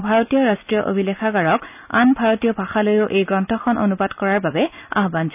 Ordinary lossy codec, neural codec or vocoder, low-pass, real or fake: none; none; 3.6 kHz; real